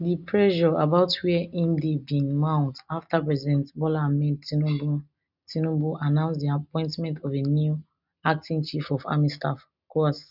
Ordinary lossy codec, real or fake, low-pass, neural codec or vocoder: none; real; 5.4 kHz; none